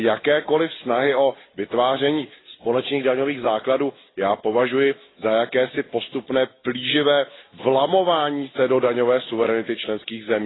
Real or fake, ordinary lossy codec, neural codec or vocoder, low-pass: real; AAC, 16 kbps; none; 7.2 kHz